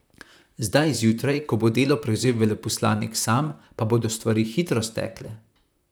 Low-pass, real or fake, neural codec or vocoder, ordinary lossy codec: none; fake; vocoder, 44.1 kHz, 128 mel bands, Pupu-Vocoder; none